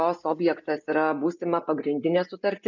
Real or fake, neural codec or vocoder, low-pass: real; none; 7.2 kHz